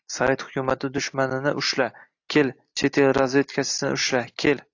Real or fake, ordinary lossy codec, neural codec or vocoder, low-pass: real; AAC, 48 kbps; none; 7.2 kHz